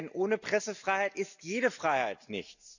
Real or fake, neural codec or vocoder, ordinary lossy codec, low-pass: real; none; none; 7.2 kHz